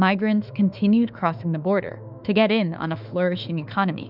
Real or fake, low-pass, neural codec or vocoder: fake; 5.4 kHz; autoencoder, 48 kHz, 32 numbers a frame, DAC-VAE, trained on Japanese speech